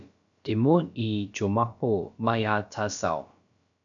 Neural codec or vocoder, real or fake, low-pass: codec, 16 kHz, about 1 kbps, DyCAST, with the encoder's durations; fake; 7.2 kHz